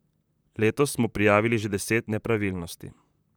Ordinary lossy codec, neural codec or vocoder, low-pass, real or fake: none; vocoder, 44.1 kHz, 128 mel bands, Pupu-Vocoder; none; fake